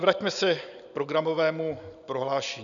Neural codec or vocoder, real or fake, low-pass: none; real; 7.2 kHz